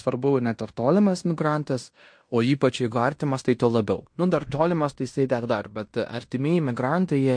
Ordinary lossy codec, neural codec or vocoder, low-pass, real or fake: MP3, 48 kbps; codec, 16 kHz in and 24 kHz out, 0.9 kbps, LongCat-Audio-Codec, fine tuned four codebook decoder; 9.9 kHz; fake